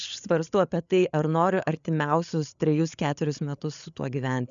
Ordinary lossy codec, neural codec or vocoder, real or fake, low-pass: MP3, 96 kbps; codec, 16 kHz, 8 kbps, FreqCodec, larger model; fake; 7.2 kHz